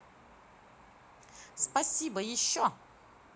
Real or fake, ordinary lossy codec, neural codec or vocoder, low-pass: real; none; none; none